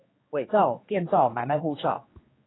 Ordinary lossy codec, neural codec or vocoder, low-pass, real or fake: AAC, 16 kbps; codec, 16 kHz, 2 kbps, X-Codec, HuBERT features, trained on general audio; 7.2 kHz; fake